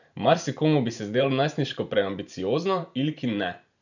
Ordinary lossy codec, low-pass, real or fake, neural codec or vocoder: none; 7.2 kHz; fake; vocoder, 44.1 kHz, 128 mel bands every 512 samples, BigVGAN v2